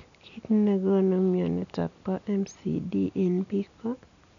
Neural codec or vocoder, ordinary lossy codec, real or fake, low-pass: none; none; real; 7.2 kHz